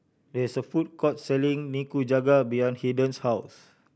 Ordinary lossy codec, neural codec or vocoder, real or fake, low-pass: none; none; real; none